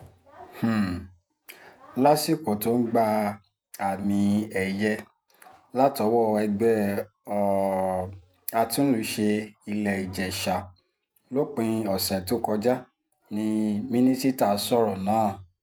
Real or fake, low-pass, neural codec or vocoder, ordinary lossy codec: fake; none; vocoder, 48 kHz, 128 mel bands, Vocos; none